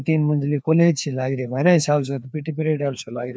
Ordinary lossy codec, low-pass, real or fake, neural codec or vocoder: none; none; fake; codec, 16 kHz, 2 kbps, FreqCodec, larger model